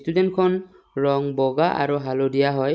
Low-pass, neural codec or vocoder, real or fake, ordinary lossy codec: none; none; real; none